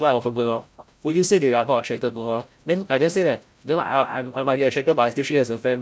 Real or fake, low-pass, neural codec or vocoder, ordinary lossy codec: fake; none; codec, 16 kHz, 0.5 kbps, FreqCodec, larger model; none